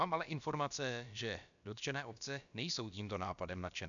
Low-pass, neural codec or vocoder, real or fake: 7.2 kHz; codec, 16 kHz, about 1 kbps, DyCAST, with the encoder's durations; fake